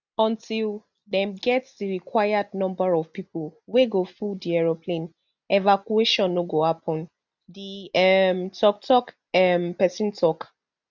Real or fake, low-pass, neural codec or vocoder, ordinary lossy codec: real; 7.2 kHz; none; none